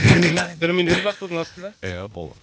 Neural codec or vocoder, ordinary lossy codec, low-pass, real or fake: codec, 16 kHz, 0.8 kbps, ZipCodec; none; none; fake